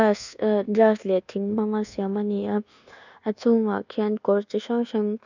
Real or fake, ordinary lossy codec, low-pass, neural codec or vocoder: fake; none; 7.2 kHz; codec, 24 kHz, 1.2 kbps, DualCodec